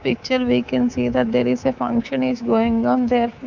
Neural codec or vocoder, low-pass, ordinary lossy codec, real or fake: codec, 24 kHz, 3.1 kbps, DualCodec; 7.2 kHz; none; fake